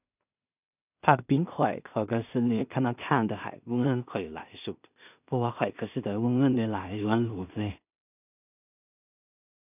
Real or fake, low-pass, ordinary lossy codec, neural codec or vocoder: fake; 3.6 kHz; none; codec, 16 kHz in and 24 kHz out, 0.4 kbps, LongCat-Audio-Codec, two codebook decoder